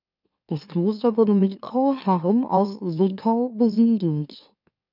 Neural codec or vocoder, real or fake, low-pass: autoencoder, 44.1 kHz, a latent of 192 numbers a frame, MeloTTS; fake; 5.4 kHz